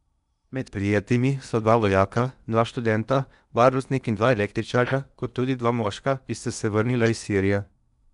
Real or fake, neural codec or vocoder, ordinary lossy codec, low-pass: fake; codec, 16 kHz in and 24 kHz out, 0.8 kbps, FocalCodec, streaming, 65536 codes; none; 10.8 kHz